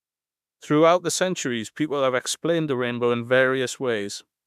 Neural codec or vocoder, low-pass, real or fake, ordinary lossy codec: autoencoder, 48 kHz, 32 numbers a frame, DAC-VAE, trained on Japanese speech; 14.4 kHz; fake; none